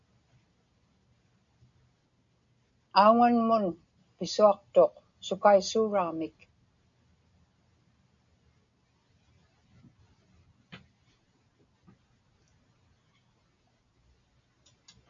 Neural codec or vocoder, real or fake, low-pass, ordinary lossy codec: none; real; 7.2 kHz; MP3, 64 kbps